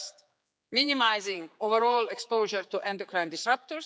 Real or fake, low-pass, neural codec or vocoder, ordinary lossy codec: fake; none; codec, 16 kHz, 4 kbps, X-Codec, HuBERT features, trained on general audio; none